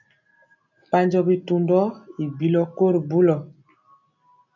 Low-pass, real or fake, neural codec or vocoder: 7.2 kHz; real; none